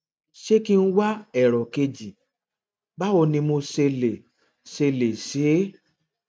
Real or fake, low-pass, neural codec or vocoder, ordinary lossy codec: real; none; none; none